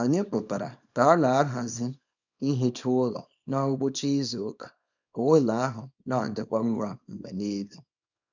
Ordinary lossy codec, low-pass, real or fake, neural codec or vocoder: none; 7.2 kHz; fake; codec, 24 kHz, 0.9 kbps, WavTokenizer, small release